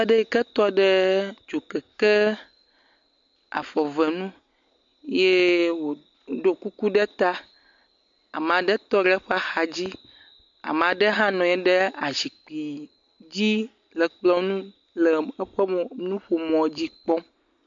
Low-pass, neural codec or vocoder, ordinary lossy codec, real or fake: 7.2 kHz; none; MP3, 48 kbps; real